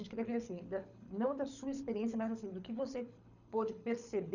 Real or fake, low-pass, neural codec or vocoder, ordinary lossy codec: fake; 7.2 kHz; codec, 24 kHz, 6 kbps, HILCodec; none